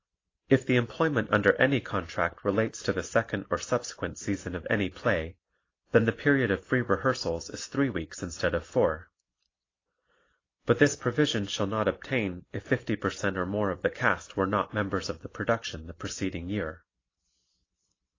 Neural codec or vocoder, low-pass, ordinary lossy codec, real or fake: none; 7.2 kHz; AAC, 32 kbps; real